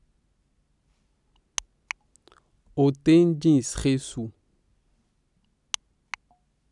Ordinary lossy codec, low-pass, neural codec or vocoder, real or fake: none; 10.8 kHz; none; real